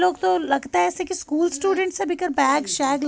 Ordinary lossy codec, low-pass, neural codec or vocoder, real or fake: none; none; none; real